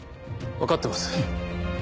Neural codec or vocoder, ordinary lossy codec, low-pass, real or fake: none; none; none; real